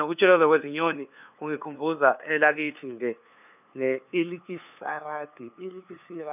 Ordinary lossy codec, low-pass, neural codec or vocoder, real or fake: none; 3.6 kHz; codec, 16 kHz, 2 kbps, FunCodec, trained on LibriTTS, 25 frames a second; fake